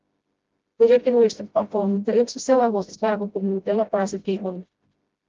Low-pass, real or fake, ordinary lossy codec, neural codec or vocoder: 7.2 kHz; fake; Opus, 32 kbps; codec, 16 kHz, 0.5 kbps, FreqCodec, smaller model